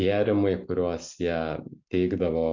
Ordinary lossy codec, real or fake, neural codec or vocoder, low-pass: MP3, 64 kbps; real; none; 7.2 kHz